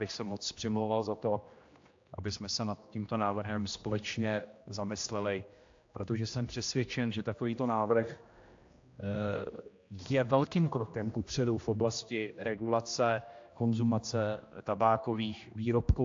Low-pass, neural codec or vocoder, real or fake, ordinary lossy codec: 7.2 kHz; codec, 16 kHz, 1 kbps, X-Codec, HuBERT features, trained on general audio; fake; AAC, 48 kbps